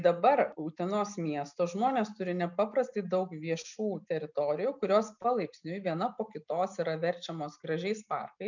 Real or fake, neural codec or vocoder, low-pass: real; none; 7.2 kHz